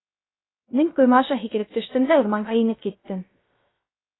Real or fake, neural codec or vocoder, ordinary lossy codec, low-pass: fake; codec, 16 kHz, 0.3 kbps, FocalCodec; AAC, 16 kbps; 7.2 kHz